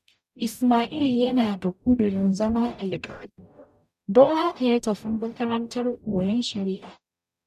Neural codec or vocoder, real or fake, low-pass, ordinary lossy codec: codec, 44.1 kHz, 0.9 kbps, DAC; fake; 14.4 kHz; none